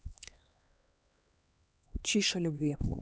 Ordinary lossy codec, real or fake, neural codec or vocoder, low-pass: none; fake; codec, 16 kHz, 2 kbps, X-Codec, HuBERT features, trained on LibriSpeech; none